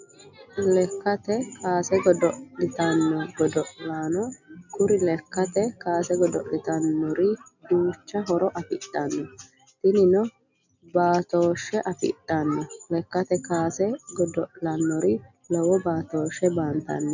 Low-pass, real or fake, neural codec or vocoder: 7.2 kHz; real; none